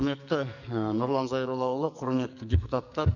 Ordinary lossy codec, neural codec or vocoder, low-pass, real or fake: none; codec, 44.1 kHz, 2.6 kbps, SNAC; 7.2 kHz; fake